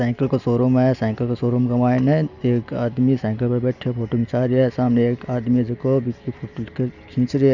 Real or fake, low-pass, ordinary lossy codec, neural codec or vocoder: real; 7.2 kHz; none; none